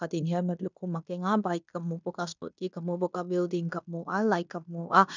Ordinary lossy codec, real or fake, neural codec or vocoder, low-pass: none; fake; codec, 16 kHz in and 24 kHz out, 0.9 kbps, LongCat-Audio-Codec, fine tuned four codebook decoder; 7.2 kHz